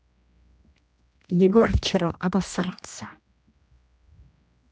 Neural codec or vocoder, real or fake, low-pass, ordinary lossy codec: codec, 16 kHz, 1 kbps, X-Codec, HuBERT features, trained on general audio; fake; none; none